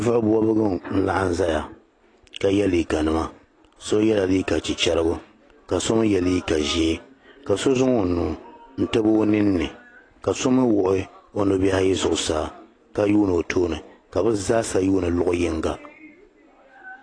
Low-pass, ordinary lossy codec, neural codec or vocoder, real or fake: 9.9 kHz; AAC, 32 kbps; none; real